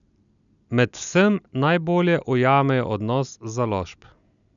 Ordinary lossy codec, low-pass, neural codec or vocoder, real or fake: none; 7.2 kHz; none; real